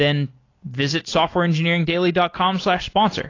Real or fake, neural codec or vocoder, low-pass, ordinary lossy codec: real; none; 7.2 kHz; AAC, 32 kbps